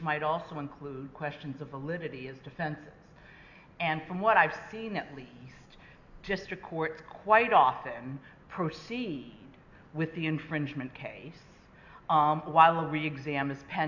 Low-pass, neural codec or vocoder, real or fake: 7.2 kHz; none; real